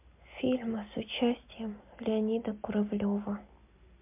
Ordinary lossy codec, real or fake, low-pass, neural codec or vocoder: MP3, 32 kbps; real; 3.6 kHz; none